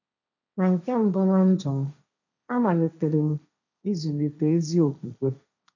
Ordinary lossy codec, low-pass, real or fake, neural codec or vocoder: none; 7.2 kHz; fake; codec, 16 kHz, 1.1 kbps, Voila-Tokenizer